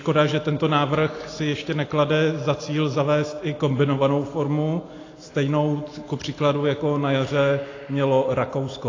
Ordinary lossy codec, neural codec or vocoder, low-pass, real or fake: AAC, 32 kbps; none; 7.2 kHz; real